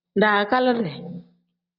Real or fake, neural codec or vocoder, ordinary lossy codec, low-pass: real; none; Opus, 64 kbps; 5.4 kHz